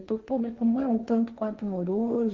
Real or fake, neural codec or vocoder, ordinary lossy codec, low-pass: fake; codec, 16 kHz, 1.1 kbps, Voila-Tokenizer; Opus, 24 kbps; 7.2 kHz